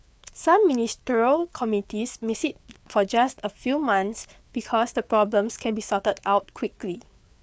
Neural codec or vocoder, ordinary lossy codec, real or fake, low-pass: codec, 16 kHz, 4 kbps, FreqCodec, larger model; none; fake; none